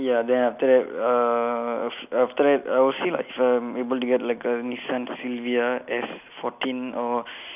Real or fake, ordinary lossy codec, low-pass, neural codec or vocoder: real; none; 3.6 kHz; none